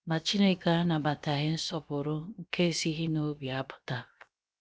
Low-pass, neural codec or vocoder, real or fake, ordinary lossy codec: none; codec, 16 kHz, 0.7 kbps, FocalCodec; fake; none